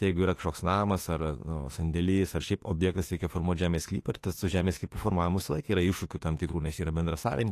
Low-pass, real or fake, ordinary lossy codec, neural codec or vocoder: 14.4 kHz; fake; AAC, 48 kbps; autoencoder, 48 kHz, 32 numbers a frame, DAC-VAE, trained on Japanese speech